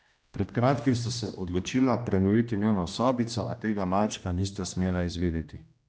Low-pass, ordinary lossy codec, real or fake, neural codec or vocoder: none; none; fake; codec, 16 kHz, 1 kbps, X-Codec, HuBERT features, trained on general audio